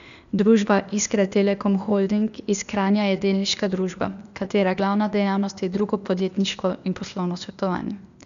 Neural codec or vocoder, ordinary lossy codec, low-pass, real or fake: codec, 16 kHz, 0.8 kbps, ZipCodec; none; 7.2 kHz; fake